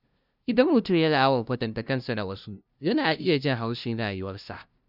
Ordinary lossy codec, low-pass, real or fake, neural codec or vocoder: none; 5.4 kHz; fake; codec, 16 kHz, 0.5 kbps, FunCodec, trained on LibriTTS, 25 frames a second